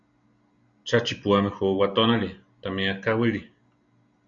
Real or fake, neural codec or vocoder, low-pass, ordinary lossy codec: real; none; 7.2 kHz; Opus, 64 kbps